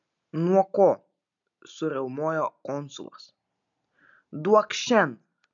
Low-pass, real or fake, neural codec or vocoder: 7.2 kHz; real; none